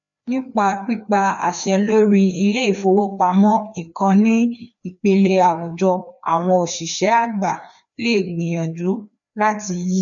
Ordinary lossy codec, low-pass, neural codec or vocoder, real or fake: none; 7.2 kHz; codec, 16 kHz, 2 kbps, FreqCodec, larger model; fake